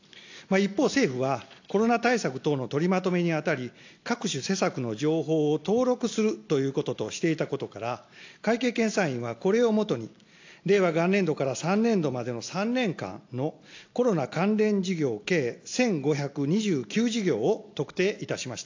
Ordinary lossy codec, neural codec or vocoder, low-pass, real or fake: AAC, 48 kbps; none; 7.2 kHz; real